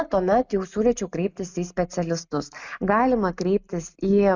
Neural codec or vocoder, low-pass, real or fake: none; 7.2 kHz; real